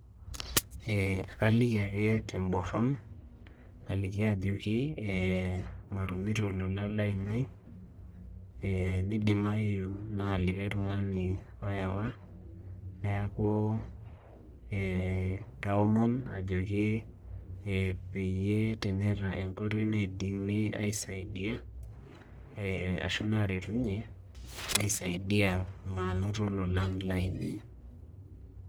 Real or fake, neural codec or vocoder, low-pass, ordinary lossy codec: fake; codec, 44.1 kHz, 1.7 kbps, Pupu-Codec; none; none